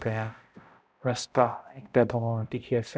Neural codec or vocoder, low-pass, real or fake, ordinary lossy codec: codec, 16 kHz, 0.5 kbps, X-Codec, HuBERT features, trained on balanced general audio; none; fake; none